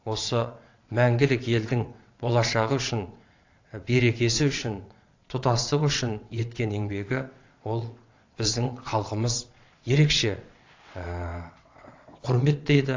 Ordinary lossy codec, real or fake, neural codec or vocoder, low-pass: AAC, 48 kbps; fake; vocoder, 22.05 kHz, 80 mel bands, WaveNeXt; 7.2 kHz